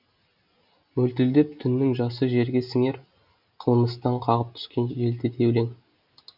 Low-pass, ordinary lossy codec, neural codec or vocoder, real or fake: 5.4 kHz; none; none; real